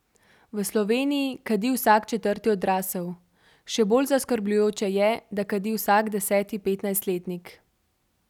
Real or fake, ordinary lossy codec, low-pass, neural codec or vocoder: real; none; 19.8 kHz; none